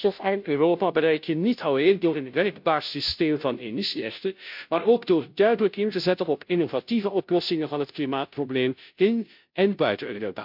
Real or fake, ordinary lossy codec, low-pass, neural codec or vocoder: fake; MP3, 48 kbps; 5.4 kHz; codec, 16 kHz, 0.5 kbps, FunCodec, trained on Chinese and English, 25 frames a second